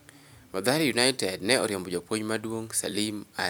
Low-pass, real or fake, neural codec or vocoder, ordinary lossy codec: none; real; none; none